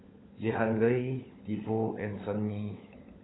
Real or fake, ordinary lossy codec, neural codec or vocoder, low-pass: fake; AAC, 16 kbps; codec, 16 kHz, 16 kbps, FunCodec, trained on LibriTTS, 50 frames a second; 7.2 kHz